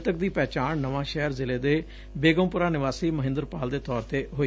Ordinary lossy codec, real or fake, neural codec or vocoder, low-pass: none; real; none; none